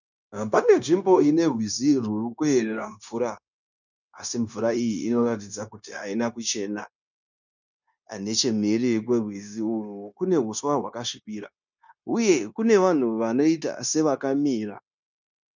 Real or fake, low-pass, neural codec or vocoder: fake; 7.2 kHz; codec, 16 kHz, 0.9 kbps, LongCat-Audio-Codec